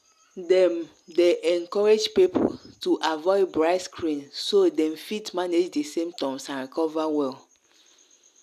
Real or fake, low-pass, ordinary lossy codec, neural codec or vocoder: real; 14.4 kHz; none; none